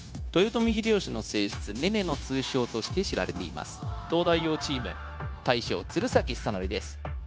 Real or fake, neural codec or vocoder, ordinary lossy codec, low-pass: fake; codec, 16 kHz, 0.9 kbps, LongCat-Audio-Codec; none; none